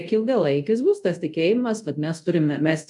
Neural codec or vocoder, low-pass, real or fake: codec, 24 kHz, 0.5 kbps, DualCodec; 10.8 kHz; fake